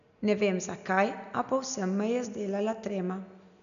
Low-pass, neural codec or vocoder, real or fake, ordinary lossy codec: 7.2 kHz; none; real; none